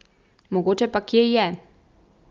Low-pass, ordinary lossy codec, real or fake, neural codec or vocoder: 7.2 kHz; Opus, 32 kbps; real; none